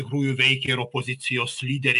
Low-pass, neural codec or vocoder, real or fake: 10.8 kHz; none; real